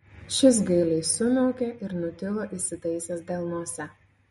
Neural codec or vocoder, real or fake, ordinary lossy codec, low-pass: none; real; MP3, 48 kbps; 19.8 kHz